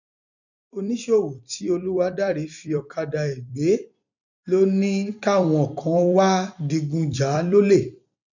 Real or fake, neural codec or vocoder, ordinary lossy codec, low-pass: fake; vocoder, 44.1 kHz, 128 mel bands every 256 samples, BigVGAN v2; none; 7.2 kHz